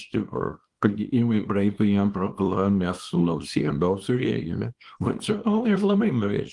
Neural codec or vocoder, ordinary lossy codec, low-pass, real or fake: codec, 24 kHz, 0.9 kbps, WavTokenizer, small release; Opus, 24 kbps; 10.8 kHz; fake